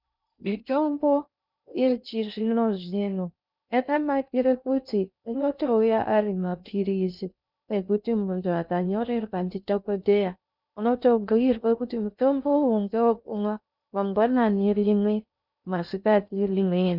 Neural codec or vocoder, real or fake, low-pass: codec, 16 kHz in and 24 kHz out, 0.6 kbps, FocalCodec, streaming, 2048 codes; fake; 5.4 kHz